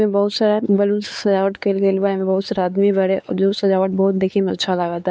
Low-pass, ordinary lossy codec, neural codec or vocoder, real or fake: none; none; codec, 16 kHz, 4 kbps, X-Codec, WavLM features, trained on Multilingual LibriSpeech; fake